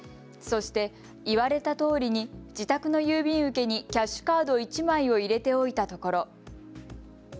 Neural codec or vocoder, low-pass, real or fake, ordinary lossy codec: none; none; real; none